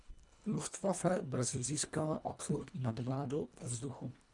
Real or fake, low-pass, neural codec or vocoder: fake; 10.8 kHz; codec, 24 kHz, 1.5 kbps, HILCodec